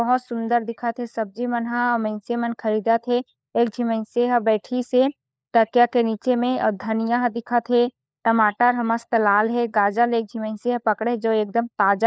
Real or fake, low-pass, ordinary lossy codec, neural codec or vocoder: fake; none; none; codec, 16 kHz, 4 kbps, FunCodec, trained on LibriTTS, 50 frames a second